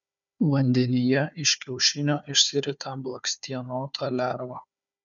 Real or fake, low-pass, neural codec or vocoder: fake; 7.2 kHz; codec, 16 kHz, 4 kbps, FunCodec, trained on Chinese and English, 50 frames a second